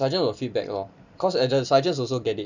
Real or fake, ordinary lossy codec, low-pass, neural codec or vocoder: real; none; 7.2 kHz; none